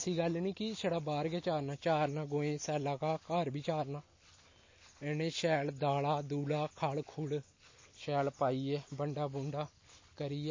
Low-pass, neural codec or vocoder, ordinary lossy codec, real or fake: 7.2 kHz; none; MP3, 32 kbps; real